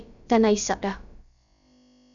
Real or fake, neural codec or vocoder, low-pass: fake; codec, 16 kHz, about 1 kbps, DyCAST, with the encoder's durations; 7.2 kHz